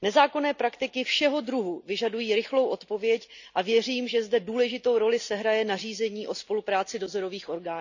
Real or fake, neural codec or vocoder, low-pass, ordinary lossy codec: real; none; 7.2 kHz; none